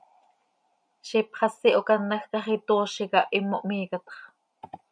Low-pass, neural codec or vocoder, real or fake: 9.9 kHz; none; real